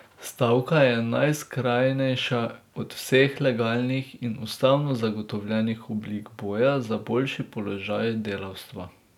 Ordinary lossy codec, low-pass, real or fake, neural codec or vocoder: none; 19.8 kHz; real; none